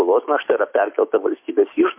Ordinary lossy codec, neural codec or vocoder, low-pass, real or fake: MP3, 32 kbps; autoencoder, 48 kHz, 128 numbers a frame, DAC-VAE, trained on Japanese speech; 3.6 kHz; fake